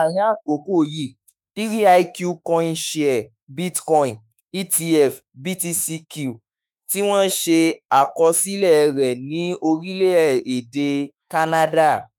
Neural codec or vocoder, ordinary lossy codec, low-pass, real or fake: autoencoder, 48 kHz, 32 numbers a frame, DAC-VAE, trained on Japanese speech; none; none; fake